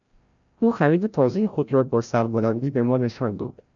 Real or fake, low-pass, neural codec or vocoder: fake; 7.2 kHz; codec, 16 kHz, 0.5 kbps, FreqCodec, larger model